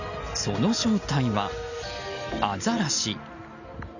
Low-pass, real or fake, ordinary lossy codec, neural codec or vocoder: 7.2 kHz; real; none; none